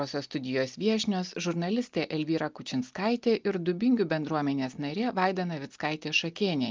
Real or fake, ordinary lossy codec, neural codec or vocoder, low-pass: real; Opus, 24 kbps; none; 7.2 kHz